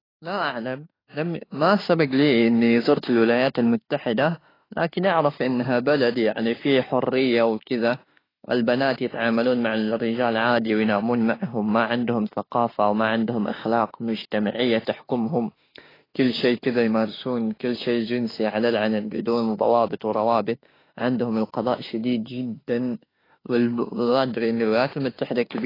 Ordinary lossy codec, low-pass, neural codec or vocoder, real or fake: AAC, 24 kbps; 5.4 kHz; autoencoder, 48 kHz, 32 numbers a frame, DAC-VAE, trained on Japanese speech; fake